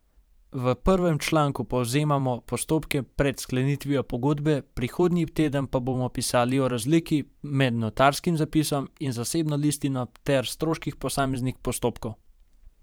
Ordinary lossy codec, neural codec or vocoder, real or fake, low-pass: none; vocoder, 44.1 kHz, 128 mel bands every 512 samples, BigVGAN v2; fake; none